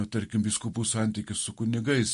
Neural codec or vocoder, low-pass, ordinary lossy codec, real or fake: none; 10.8 kHz; MP3, 48 kbps; real